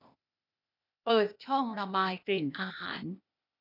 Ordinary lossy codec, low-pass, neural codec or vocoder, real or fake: none; 5.4 kHz; codec, 16 kHz, 0.8 kbps, ZipCodec; fake